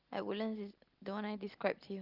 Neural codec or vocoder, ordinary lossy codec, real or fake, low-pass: none; Opus, 32 kbps; real; 5.4 kHz